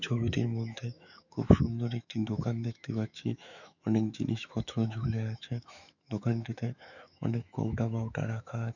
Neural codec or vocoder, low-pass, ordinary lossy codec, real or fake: autoencoder, 48 kHz, 128 numbers a frame, DAC-VAE, trained on Japanese speech; 7.2 kHz; none; fake